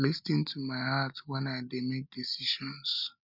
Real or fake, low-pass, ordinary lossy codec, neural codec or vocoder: real; 5.4 kHz; none; none